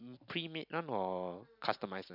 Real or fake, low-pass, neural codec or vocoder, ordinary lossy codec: real; 5.4 kHz; none; none